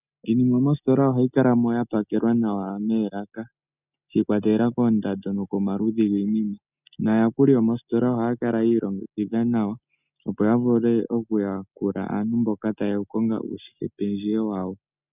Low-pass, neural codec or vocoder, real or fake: 3.6 kHz; none; real